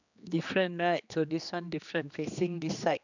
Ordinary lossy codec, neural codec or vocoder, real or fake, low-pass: none; codec, 16 kHz, 2 kbps, X-Codec, HuBERT features, trained on general audio; fake; 7.2 kHz